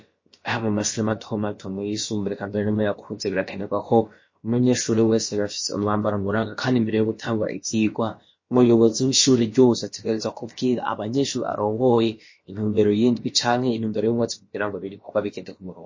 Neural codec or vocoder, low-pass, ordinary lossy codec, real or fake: codec, 16 kHz, about 1 kbps, DyCAST, with the encoder's durations; 7.2 kHz; MP3, 32 kbps; fake